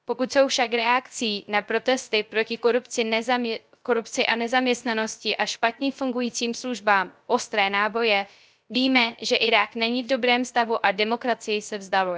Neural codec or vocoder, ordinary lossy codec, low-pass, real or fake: codec, 16 kHz, 0.3 kbps, FocalCodec; none; none; fake